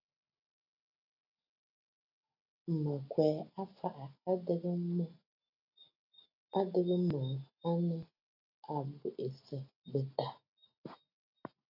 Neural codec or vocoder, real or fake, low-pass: none; real; 5.4 kHz